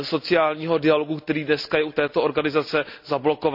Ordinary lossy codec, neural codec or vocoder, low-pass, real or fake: none; none; 5.4 kHz; real